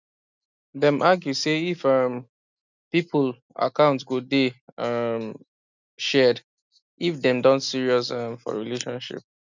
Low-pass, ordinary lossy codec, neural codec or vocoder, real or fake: 7.2 kHz; none; none; real